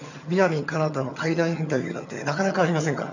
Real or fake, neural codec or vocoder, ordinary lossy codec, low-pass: fake; vocoder, 22.05 kHz, 80 mel bands, HiFi-GAN; none; 7.2 kHz